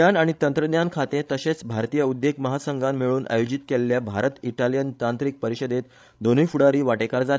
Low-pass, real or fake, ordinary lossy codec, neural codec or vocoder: none; fake; none; codec, 16 kHz, 16 kbps, FreqCodec, larger model